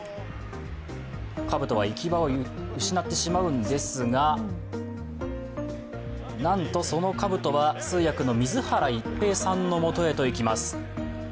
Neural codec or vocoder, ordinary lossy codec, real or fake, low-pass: none; none; real; none